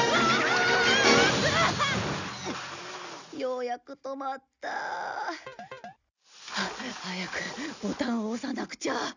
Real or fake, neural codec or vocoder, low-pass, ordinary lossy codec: real; none; 7.2 kHz; none